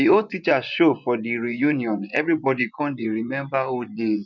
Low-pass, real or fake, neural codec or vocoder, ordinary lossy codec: 7.2 kHz; fake; vocoder, 24 kHz, 100 mel bands, Vocos; none